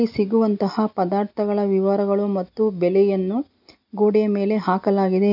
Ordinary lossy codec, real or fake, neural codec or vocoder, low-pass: none; real; none; 5.4 kHz